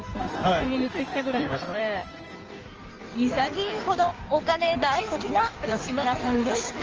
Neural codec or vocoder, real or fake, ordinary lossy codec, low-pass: codec, 16 kHz in and 24 kHz out, 1.1 kbps, FireRedTTS-2 codec; fake; Opus, 24 kbps; 7.2 kHz